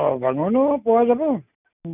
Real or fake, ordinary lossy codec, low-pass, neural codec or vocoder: real; none; 3.6 kHz; none